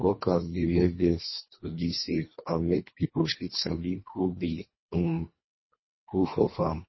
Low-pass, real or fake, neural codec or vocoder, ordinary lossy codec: 7.2 kHz; fake; codec, 24 kHz, 1.5 kbps, HILCodec; MP3, 24 kbps